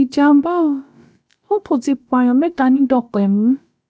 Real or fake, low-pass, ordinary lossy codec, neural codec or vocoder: fake; none; none; codec, 16 kHz, about 1 kbps, DyCAST, with the encoder's durations